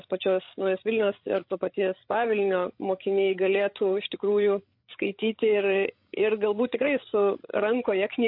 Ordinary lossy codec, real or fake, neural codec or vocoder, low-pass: MP3, 32 kbps; fake; codec, 16 kHz, 16 kbps, FreqCodec, larger model; 5.4 kHz